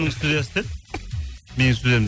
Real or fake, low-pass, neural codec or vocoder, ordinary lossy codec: real; none; none; none